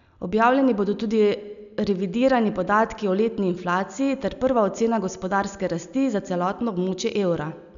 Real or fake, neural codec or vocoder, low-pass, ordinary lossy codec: real; none; 7.2 kHz; none